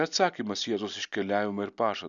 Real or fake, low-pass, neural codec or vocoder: real; 7.2 kHz; none